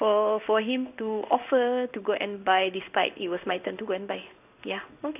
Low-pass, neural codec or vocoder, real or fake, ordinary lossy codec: 3.6 kHz; codec, 16 kHz in and 24 kHz out, 1 kbps, XY-Tokenizer; fake; none